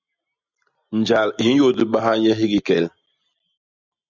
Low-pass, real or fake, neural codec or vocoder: 7.2 kHz; real; none